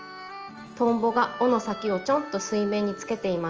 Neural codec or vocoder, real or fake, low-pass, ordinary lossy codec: none; real; 7.2 kHz; Opus, 24 kbps